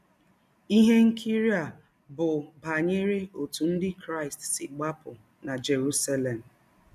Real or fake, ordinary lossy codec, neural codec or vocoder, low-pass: real; none; none; 14.4 kHz